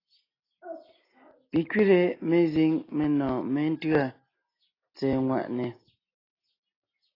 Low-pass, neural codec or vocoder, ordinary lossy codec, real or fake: 5.4 kHz; none; AAC, 24 kbps; real